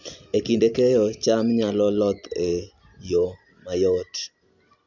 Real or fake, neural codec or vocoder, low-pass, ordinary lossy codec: real; none; 7.2 kHz; none